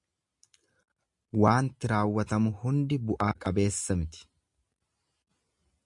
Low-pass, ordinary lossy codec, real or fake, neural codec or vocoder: 10.8 kHz; MP3, 64 kbps; real; none